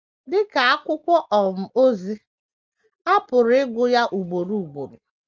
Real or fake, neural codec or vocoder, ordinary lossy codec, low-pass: real; none; Opus, 24 kbps; 7.2 kHz